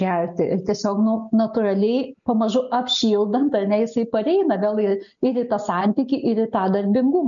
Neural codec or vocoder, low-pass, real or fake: none; 7.2 kHz; real